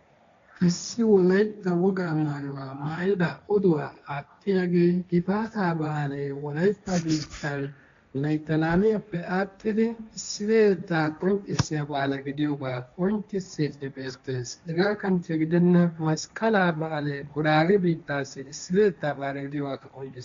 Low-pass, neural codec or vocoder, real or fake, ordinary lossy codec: 7.2 kHz; codec, 16 kHz, 1.1 kbps, Voila-Tokenizer; fake; MP3, 64 kbps